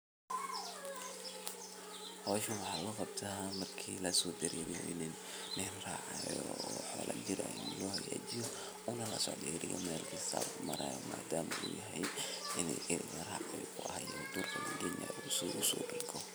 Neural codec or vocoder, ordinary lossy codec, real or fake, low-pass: vocoder, 44.1 kHz, 128 mel bands every 256 samples, BigVGAN v2; none; fake; none